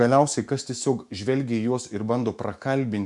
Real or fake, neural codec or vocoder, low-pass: fake; autoencoder, 48 kHz, 128 numbers a frame, DAC-VAE, trained on Japanese speech; 10.8 kHz